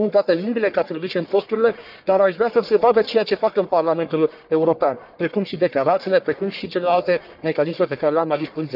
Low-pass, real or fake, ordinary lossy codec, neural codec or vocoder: 5.4 kHz; fake; none; codec, 44.1 kHz, 1.7 kbps, Pupu-Codec